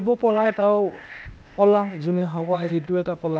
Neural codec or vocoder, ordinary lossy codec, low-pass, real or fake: codec, 16 kHz, 0.8 kbps, ZipCodec; none; none; fake